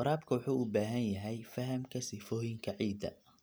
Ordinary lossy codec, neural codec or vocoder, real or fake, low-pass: none; none; real; none